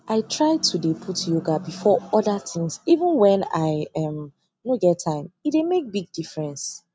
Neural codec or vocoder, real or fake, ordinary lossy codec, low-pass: none; real; none; none